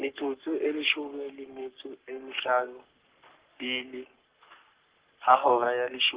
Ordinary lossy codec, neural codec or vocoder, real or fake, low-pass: Opus, 16 kbps; codec, 44.1 kHz, 3.4 kbps, Pupu-Codec; fake; 3.6 kHz